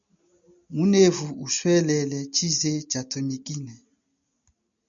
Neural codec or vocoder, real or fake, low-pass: none; real; 7.2 kHz